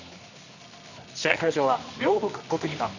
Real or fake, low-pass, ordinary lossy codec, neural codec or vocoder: fake; 7.2 kHz; none; codec, 24 kHz, 0.9 kbps, WavTokenizer, medium music audio release